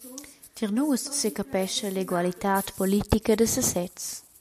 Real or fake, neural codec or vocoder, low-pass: real; none; 14.4 kHz